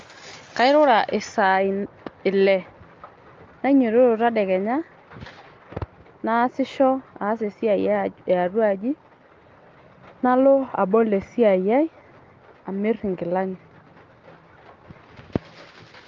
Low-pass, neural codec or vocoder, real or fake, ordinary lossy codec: 7.2 kHz; none; real; Opus, 32 kbps